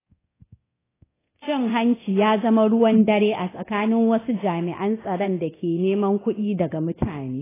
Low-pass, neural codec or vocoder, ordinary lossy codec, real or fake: 3.6 kHz; codec, 24 kHz, 0.9 kbps, DualCodec; AAC, 16 kbps; fake